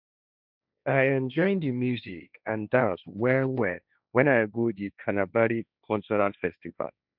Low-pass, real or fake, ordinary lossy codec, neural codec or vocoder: 5.4 kHz; fake; none; codec, 16 kHz, 1.1 kbps, Voila-Tokenizer